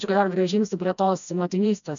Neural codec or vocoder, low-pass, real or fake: codec, 16 kHz, 1 kbps, FreqCodec, smaller model; 7.2 kHz; fake